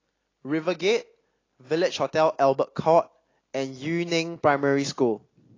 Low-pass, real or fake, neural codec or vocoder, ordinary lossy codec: 7.2 kHz; real; none; AAC, 32 kbps